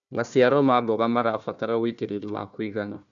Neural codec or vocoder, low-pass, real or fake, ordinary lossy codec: codec, 16 kHz, 1 kbps, FunCodec, trained on Chinese and English, 50 frames a second; 7.2 kHz; fake; none